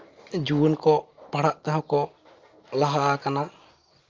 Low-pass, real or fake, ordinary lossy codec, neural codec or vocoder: 7.2 kHz; real; Opus, 32 kbps; none